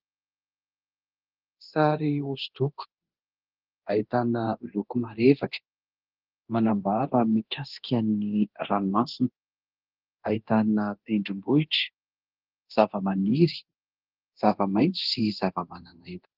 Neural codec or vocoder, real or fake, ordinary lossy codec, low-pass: codec, 24 kHz, 0.9 kbps, DualCodec; fake; Opus, 16 kbps; 5.4 kHz